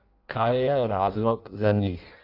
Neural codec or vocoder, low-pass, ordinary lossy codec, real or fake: codec, 16 kHz in and 24 kHz out, 0.6 kbps, FireRedTTS-2 codec; 5.4 kHz; Opus, 24 kbps; fake